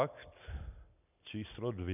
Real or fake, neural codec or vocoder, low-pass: real; none; 3.6 kHz